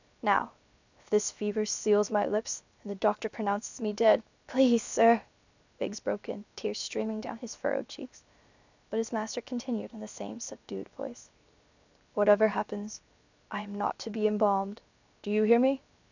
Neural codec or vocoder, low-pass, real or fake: codec, 16 kHz, 0.7 kbps, FocalCodec; 7.2 kHz; fake